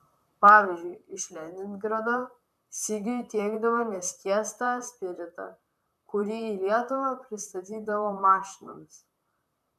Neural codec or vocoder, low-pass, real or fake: vocoder, 44.1 kHz, 128 mel bands, Pupu-Vocoder; 14.4 kHz; fake